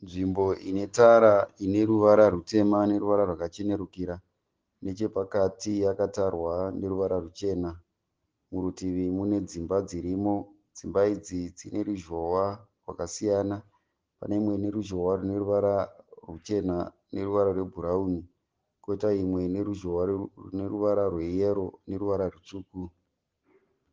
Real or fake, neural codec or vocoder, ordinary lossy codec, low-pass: real; none; Opus, 16 kbps; 7.2 kHz